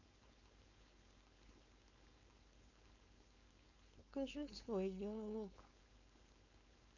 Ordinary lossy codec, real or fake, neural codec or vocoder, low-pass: none; fake; codec, 16 kHz, 4.8 kbps, FACodec; 7.2 kHz